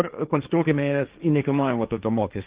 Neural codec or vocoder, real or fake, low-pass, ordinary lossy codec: codec, 16 kHz, 1.1 kbps, Voila-Tokenizer; fake; 3.6 kHz; Opus, 24 kbps